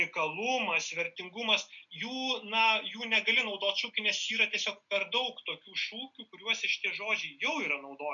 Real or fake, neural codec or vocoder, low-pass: real; none; 7.2 kHz